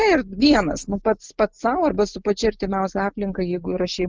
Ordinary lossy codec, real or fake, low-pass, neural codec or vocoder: Opus, 24 kbps; real; 7.2 kHz; none